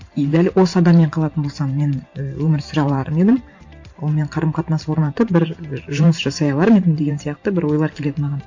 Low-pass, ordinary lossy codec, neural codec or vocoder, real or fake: 7.2 kHz; MP3, 48 kbps; vocoder, 44.1 kHz, 128 mel bands every 512 samples, BigVGAN v2; fake